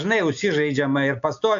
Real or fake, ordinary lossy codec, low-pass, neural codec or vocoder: real; AAC, 64 kbps; 7.2 kHz; none